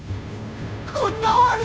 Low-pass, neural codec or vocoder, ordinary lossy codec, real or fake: none; codec, 16 kHz, 0.5 kbps, FunCodec, trained on Chinese and English, 25 frames a second; none; fake